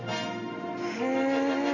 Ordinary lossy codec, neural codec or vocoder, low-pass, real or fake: none; none; 7.2 kHz; real